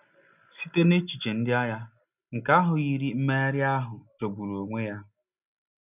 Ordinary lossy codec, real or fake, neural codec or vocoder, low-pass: none; real; none; 3.6 kHz